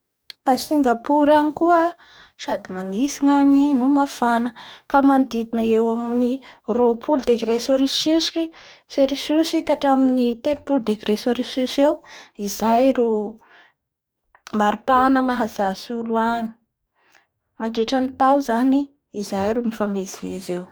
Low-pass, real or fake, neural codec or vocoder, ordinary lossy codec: none; fake; codec, 44.1 kHz, 2.6 kbps, DAC; none